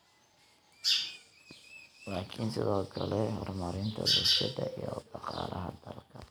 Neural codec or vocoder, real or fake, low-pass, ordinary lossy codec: none; real; none; none